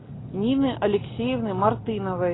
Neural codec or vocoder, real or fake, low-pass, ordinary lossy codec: none; real; 7.2 kHz; AAC, 16 kbps